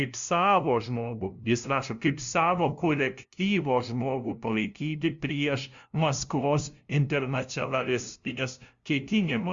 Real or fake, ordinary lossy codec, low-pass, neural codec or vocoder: fake; Opus, 64 kbps; 7.2 kHz; codec, 16 kHz, 0.5 kbps, FunCodec, trained on LibriTTS, 25 frames a second